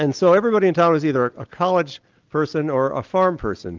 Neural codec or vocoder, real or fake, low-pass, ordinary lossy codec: none; real; 7.2 kHz; Opus, 32 kbps